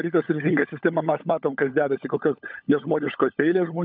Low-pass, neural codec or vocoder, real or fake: 5.4 kHz; codec, 16 kHz, 16 kbps, FunCodec, trained on LibriTTS, 50 frames a second; fake